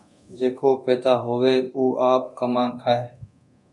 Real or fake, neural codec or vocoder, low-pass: fake; codec, 24 kHz, 0.9 kbps, DualCodec; 10.8 kHz